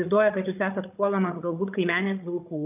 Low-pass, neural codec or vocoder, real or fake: 3.6 kHz; codec, 16 kHz, 8 kbps, FreqCodec, larger model; fake